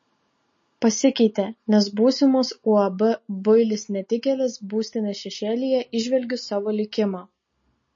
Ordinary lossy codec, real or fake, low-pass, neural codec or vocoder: MP3, 32 kbps; real; 7.2 kHz; none